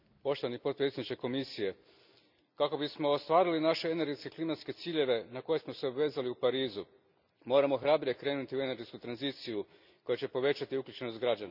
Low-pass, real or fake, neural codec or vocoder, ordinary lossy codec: 5.4 kHz; real; none; none